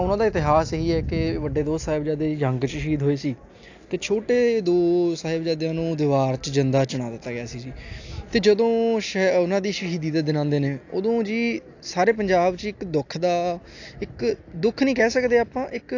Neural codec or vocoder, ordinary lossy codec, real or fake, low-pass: none; AAC, 48 kbps; real; 7.2 kHz